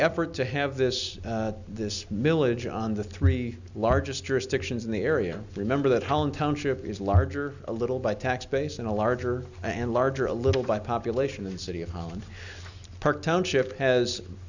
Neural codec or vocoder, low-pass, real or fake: none; 7.2 kHz; real